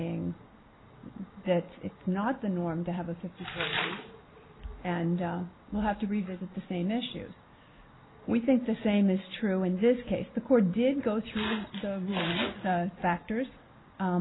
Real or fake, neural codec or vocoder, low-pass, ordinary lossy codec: real; none; 7.2 kHz; AAC, 16 kbps